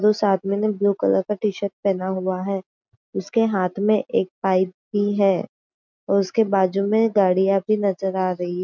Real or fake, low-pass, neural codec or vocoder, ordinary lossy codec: real; 7.2 kHz; none; MP3, 64 kbps